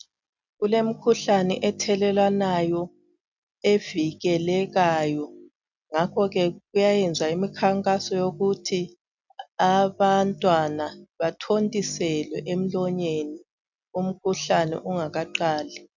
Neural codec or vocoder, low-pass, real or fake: none; 7.2 kHz; real